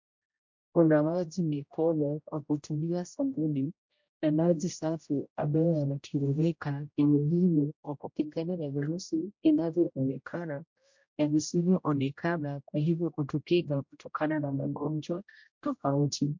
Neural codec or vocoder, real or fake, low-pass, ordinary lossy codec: codec, 16 kHz, 0.5 kbps, X-Codec, HuBERT features, trained on general audio; fake; 7.2 kHz; MP3, 48 kbps